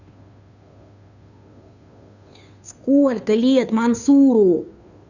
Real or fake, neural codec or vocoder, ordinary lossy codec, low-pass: fake; codec, 16 kHz, 2 kbps, FunCodec, trained on Chinese and English, 25 frames a second; none; 7.2 kHz